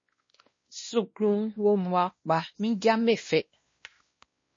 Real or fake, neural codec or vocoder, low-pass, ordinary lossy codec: fake; codec, 16 kHz, 1 kbps, X-Codec, WavLM features, trained on Multilingual LibriSpeech; 7.2 kHz; MP3, 32 kbps